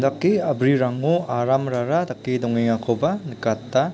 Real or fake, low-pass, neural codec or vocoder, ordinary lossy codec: real; none; none; none